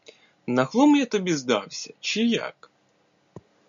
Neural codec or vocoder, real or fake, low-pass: none; real; 7.2 kHz